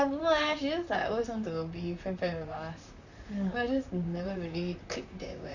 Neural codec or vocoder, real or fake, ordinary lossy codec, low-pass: vocoder, 44.1 kHz, 128 mel bands, Pupu-Vocoder; fake; none; 7.2 kHz